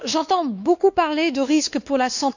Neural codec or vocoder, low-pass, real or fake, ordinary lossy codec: codec, 16 kHz, 2 kbps, X-Codec, WavLM features, trained on Multilingual LibriSpeech; 7.2 kHz; fake; none